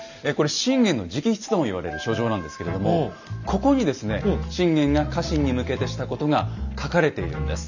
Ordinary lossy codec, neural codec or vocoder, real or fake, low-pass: none; none; real; 7.2 kHz